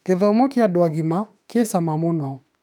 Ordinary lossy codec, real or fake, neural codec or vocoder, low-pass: none; fake; autoencoder, 48 kHz, 32 numbers a frame, DAC-VAE, trained on Japanese speech; 19.8 kHz